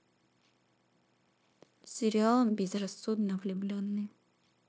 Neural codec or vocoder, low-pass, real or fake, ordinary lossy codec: codec, 16 kHz, 0.9 kbps, LongCat-Audio-Codec; none; fake; none